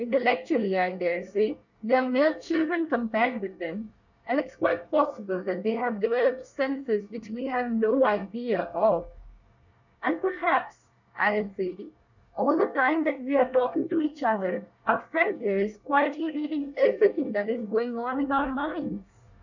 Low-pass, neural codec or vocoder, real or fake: 7.2 kHz; codec, 24 kHz, 1 kbps, SNAC; fake